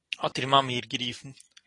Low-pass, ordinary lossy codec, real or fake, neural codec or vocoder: 10.8 kHz; AAC, 32 kbps; real; none